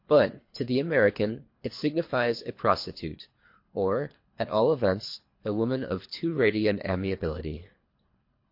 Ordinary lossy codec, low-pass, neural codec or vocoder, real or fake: MP3, 32 kbps; 5.4 kHz; codec, 24 kHz, 3 kbps, HILCodec; fake